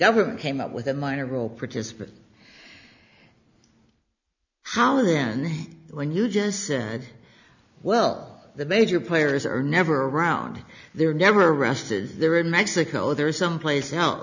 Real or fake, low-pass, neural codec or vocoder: real; 7.2 kHz; none